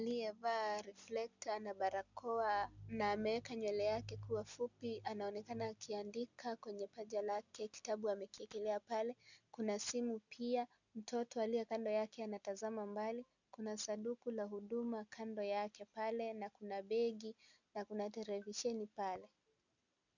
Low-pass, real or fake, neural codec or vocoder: 7.2 kHz; real; none